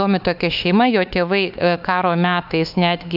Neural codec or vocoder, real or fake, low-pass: autoencoder, 48 kHz, 32 numbers a frame, DAC-VAE, trained on Japanese speech; fake; 5.4 kHz